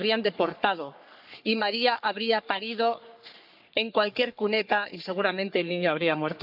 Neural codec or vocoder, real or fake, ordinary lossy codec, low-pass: codec, 44.1 kHz, 3.4 kbps, Pupu-Codec; fake; none; 5.4 kHz